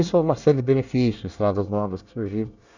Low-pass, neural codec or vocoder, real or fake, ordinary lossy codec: 7.2 kHz; codec, 24 kHz, 1 kbps, SNAC; fake; none